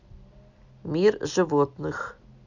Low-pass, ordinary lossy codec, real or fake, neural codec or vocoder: 7.2 kHz; none; real; none